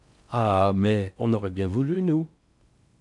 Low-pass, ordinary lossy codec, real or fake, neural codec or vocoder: 10.8 kHz; MP3, 96 kbps; fake; codec, 16 kHz in and 24 kHz out, 0.6 kbps, FocalCodec, streaming, 4096 codes